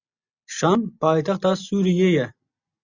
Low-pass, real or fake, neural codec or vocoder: 7.2 kHz; real; none